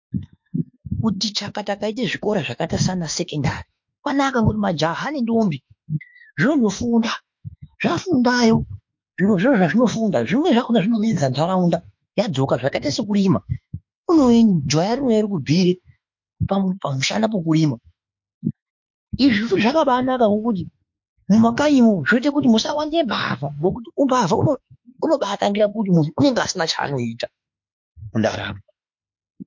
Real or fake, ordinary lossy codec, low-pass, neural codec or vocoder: fake; MP3, 48 kbps; 7.2 kHz; autoencoder, 48 kHz, 32 numbers a frame, DAC-VAE, trained on Japanese speech